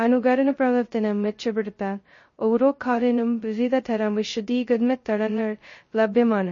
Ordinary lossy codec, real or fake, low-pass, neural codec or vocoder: MP3, 32 kbps; fake; 7.2 kHz; codec, 16 kHz, 0.2 kbps, FocalCodec